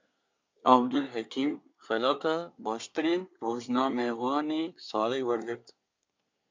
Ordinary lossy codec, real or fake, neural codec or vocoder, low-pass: MP3, 64 kbps; fake; codec, 24 kHz, 1 kbps, SNAC; 7.2 kHz